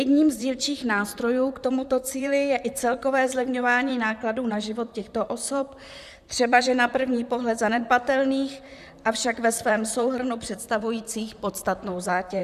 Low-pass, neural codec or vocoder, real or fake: 14.4 kHz; vocoder, 44.1 kHz, 128 mel bands, Pupu-Vocoder; fake